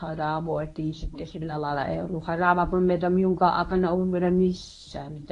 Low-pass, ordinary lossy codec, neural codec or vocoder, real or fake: 10.8 kHz; AAC, 48 kbps; codec, 24 kHz, 0.9 kbps, WavTokenizer, medium speech release version 1; fake